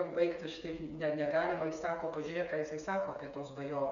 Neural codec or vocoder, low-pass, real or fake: codec, 16 kHz, 4 kbps, FreqCodec, smaller model; 7.2 kHz; fake